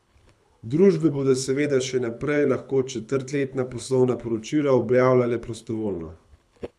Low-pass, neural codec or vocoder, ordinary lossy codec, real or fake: none; codec, 24 kHz, 6 kbps, HILCodec; none; fake